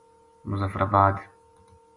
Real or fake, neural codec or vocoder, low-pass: real; none; 10.8 kHz